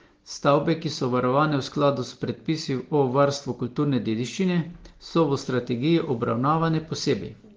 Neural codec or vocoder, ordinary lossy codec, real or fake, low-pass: none; Opus, 16 kbps; real; 7.2 kHz